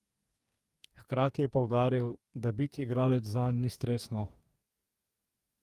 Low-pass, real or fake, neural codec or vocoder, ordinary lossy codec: 14.4 kHz; fake; codec, 44.1 kHz, 2.6 kbps, DAC; Opus, 32 kbps